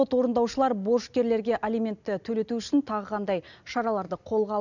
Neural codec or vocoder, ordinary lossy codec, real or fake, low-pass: none; none; real; 7.2 kHz